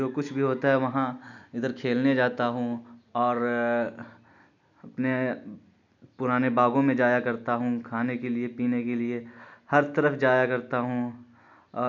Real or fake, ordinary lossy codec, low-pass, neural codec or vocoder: real; none; 7.2 kHz; none